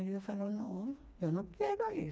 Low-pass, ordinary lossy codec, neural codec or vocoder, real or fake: none; none; codec, 16 kHz, 2 kbps, FreqCodec, smaller model; fake